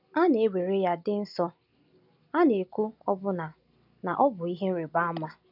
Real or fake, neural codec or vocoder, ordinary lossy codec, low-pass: real; none; none; 5.4 kHz